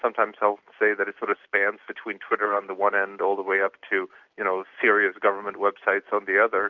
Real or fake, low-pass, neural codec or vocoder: real; 7.2 kHz; none